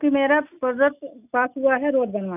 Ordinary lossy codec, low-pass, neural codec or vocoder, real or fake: none; 3.6 kHz; none; real